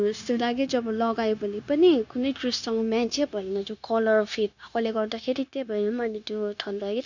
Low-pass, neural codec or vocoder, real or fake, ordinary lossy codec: 7.2 kHz; codec, 16 kHz, 0.9 kbps, LongCat-Audio-Codec; fake; none